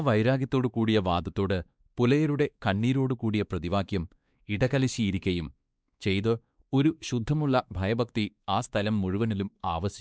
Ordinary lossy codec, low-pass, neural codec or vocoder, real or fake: none; none; codec, 16 kHz, 2 kbps, X-Codec, WavLM features, trained on Multilingual LibriSpeech; fake